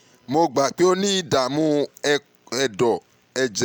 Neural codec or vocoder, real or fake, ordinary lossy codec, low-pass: none; real; none; none